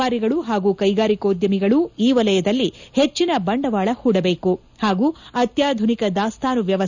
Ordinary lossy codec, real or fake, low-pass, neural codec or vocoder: none; real; 7.2 kHz; none